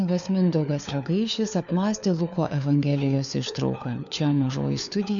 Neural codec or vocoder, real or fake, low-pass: codec, 16 kHz, 4 kbps, FunCodec, trained on LibriTTS, 50 frames a second; fake; 7.2 kHz